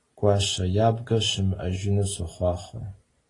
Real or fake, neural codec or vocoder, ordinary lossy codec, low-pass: real; none; AAC, 32 kbps; 10.8 kHz